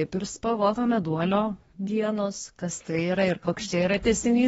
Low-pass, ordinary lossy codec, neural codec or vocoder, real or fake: 10.8 kHz; AAC, 24 kbps; codec, 24 kHz, 1 kbps, SNAC; fake